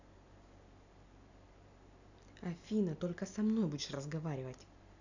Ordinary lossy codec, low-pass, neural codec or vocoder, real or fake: none; 7.2 kHz; none; real